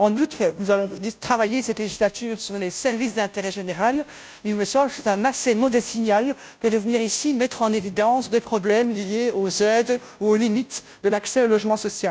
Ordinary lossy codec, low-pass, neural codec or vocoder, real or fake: none; none; codec, 16 kHz, 0.5 kbps, FunCodec, trained on Chinese and English, 25 frames a second; fake